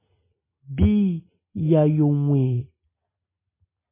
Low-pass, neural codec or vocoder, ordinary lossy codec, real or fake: 3.6 kHz; none; MP3, 16 kbps; real